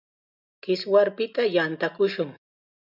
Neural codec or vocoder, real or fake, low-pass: none; real; 5.4 kHz